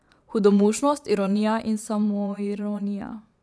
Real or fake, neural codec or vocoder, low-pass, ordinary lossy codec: fake; vocoder, 22.05 kHz, 80 mel bands, Vocos; none; none